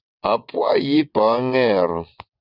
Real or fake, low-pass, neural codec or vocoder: fake; 5.4 kHz; vocoder, 22.05 kHz, 80 mel bands, WaveNeXt